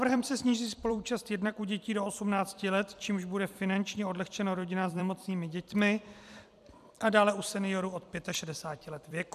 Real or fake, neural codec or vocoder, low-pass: fake; vocoder, 44.1 kHz, 128 mel bands every 256 samples, BigVGAN v2; 14.4 kHz